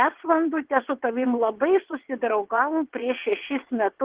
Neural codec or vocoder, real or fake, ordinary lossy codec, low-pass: vocoder, 22.05 kHz, 80 mel bands, WaveNeXt; fake; Opus, 16 kbps; 3.6 kHz